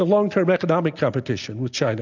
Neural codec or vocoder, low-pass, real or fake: none; 7.2 kHz; real